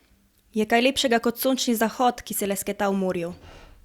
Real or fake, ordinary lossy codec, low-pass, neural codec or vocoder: real; Opus, 64 kbps; 19.8 kHz; none